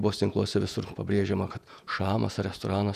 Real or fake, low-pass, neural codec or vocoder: fake; 14.4 kHz; vocoder, 48 kHz, 128 mel bands, Vocos